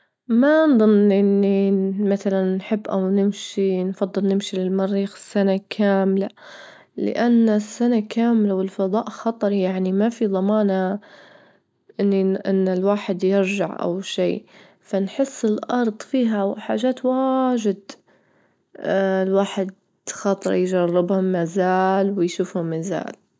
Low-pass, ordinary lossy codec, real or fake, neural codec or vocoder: none; none; real; none